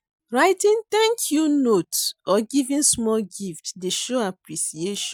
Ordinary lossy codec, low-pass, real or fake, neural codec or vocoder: none; 19.8 kHz; real; none